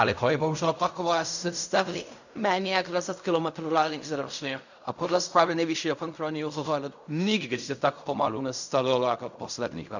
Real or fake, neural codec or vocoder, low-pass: fake; codec, 16 kHz in and 24 kHz out, 0.4 kbps, LongCat-Audio-Codec, fine tuned four codebook decoder; 7.2 kHz